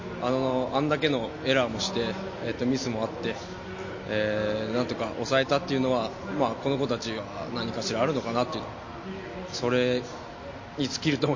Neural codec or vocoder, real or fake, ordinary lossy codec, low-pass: none; real; MP3, 32 kbps; 7.2 kHz